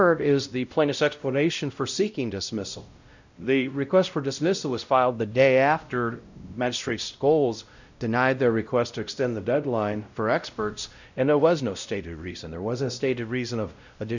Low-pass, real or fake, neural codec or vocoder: 7.2 kHz; fake; codec, 16 kHz, 0.5 kbps, X-Codec, WavLM features, trained on Multilingual LibriSpeech